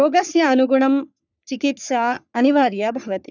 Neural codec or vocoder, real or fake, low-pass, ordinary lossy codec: codec, 44.1 kHz, 3.4 kbps, Pupu-Codec; fake; 7.2 kHz; none